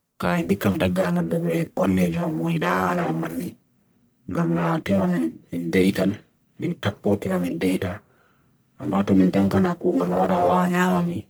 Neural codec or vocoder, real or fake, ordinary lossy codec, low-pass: codec, 44.1 kHz, 1.7 kbps, Pupu-Codec; fake; none; none